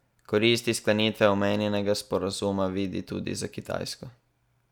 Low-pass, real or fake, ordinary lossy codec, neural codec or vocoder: 19.8 kHz; real; none; none